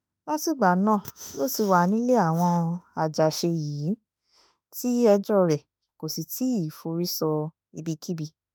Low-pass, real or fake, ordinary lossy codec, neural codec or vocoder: none; fake; none; autoencoder, 48 kHz, 32 numbers a frame, DAC-VAE, trained on Japanese speech